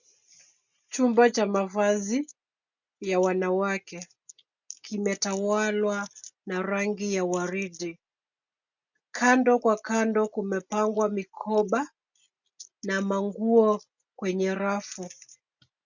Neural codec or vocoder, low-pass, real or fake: none; 7.2 kHz; real